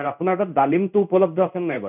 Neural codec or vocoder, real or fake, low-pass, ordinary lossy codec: codec, 16 kHz in and 24 kHz out, 1 kbps, XY-Tokenizer; fake; 3.6 kHz; none